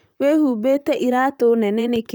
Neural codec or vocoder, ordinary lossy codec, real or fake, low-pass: vocoder, 44.1 kHz, 128 mel bands, Pupu-Vocoder; none; fake; none